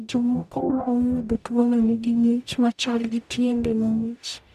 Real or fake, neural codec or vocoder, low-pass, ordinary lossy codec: fake; codec, 44.1 kHz, 0.9 kbps, DAC; 14.4 kHz; none